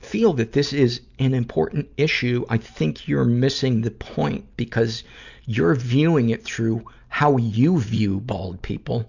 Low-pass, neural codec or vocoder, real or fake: 7.2 kHz; none; real